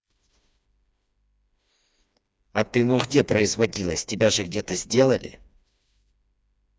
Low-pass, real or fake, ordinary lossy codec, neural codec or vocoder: none; fake; none; codec, 16 kHz, 2 kbps, FreqCodec, smaller model